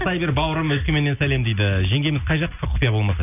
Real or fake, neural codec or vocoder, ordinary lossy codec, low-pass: real; none; none; 3.6 kHz